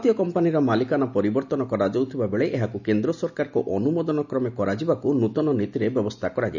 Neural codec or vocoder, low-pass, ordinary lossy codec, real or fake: none; 7.2 kHz; none; real